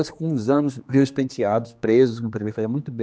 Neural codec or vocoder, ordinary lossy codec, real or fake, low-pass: codec, 16 kHz, 2 kbps, X-Codec, HuBERT features, trained on general audio; none; fake; none